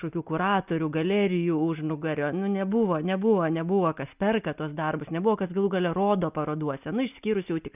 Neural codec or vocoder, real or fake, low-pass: none; real; 3.6 kHz